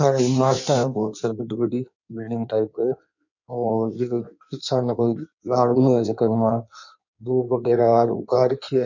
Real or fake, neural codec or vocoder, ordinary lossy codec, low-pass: fake; codec, 16 kHz in and 24 kHz out, 1.1 kbps, FireRedTTS-2 codec; none; 7.2 kHz